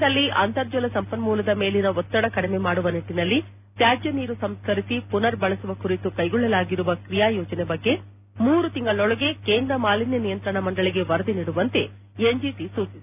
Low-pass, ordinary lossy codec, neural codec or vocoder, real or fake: 3.6 kHz; none; none; real